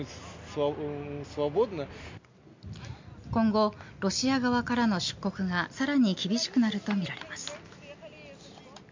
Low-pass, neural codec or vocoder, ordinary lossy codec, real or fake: 7.2 kHz; none; MP3, 64 kbps; real